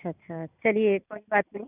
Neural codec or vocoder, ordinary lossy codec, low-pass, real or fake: vocoder, 44.1 kHz, 128 mel bands every 512 samples, BigVGAN v2; none; 3.6 kHz; fake